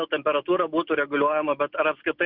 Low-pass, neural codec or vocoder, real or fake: 5.4 kHz; none; real